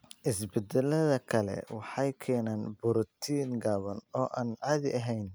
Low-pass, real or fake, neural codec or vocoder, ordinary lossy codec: none; real; none; none